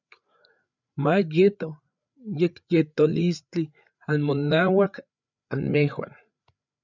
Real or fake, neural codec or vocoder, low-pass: fake; codec, 16 kHz, 8 kbps, FreqCodec, larger model; 7.2 kHz